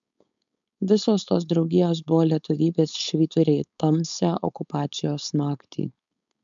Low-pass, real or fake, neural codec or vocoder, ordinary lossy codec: 7.2 kHz; fake; codec, 16 kHz, 4.8 kbps, FACodec; MP3, 64 kbps